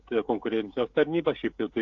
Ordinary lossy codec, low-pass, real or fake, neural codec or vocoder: AAC, 48 kbps; 7.2 kHz; fake; codec, 16 kHz, 16 kbps, FreqCodec, smaller model